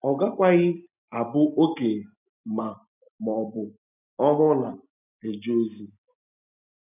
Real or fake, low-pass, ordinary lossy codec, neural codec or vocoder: real; 3.6 kHz; none; none